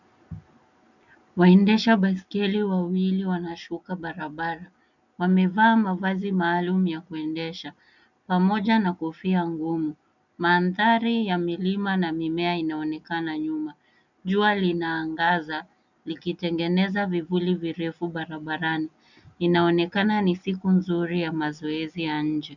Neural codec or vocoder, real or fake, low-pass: none; real; 7.2 kHz